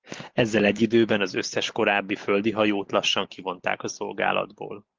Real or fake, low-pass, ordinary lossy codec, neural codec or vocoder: real; 7.2 kHz; Opus, 16 kbps; none